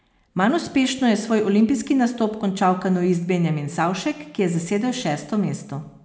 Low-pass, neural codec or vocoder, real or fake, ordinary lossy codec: none; none; real; none